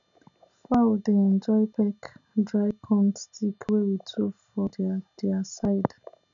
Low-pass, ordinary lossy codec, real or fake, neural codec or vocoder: 7.2 kHz; none; real; none